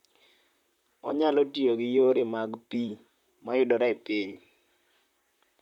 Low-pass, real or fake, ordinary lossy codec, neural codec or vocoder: 19.8 kHz; fake; none; vocoder, 44.1 kHz, 128 mel bands, Pupu-Vocoder